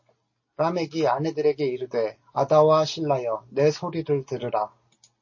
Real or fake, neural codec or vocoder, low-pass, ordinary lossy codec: real; none; 7.2 kHz; MP3, 32 kbps